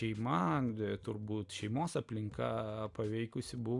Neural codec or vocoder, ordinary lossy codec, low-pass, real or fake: none; Opus, 64 kbps; 10.8 kHz; real